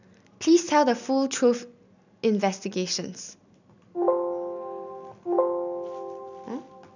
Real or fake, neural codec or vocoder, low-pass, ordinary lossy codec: real; none; 7.2 kHz; none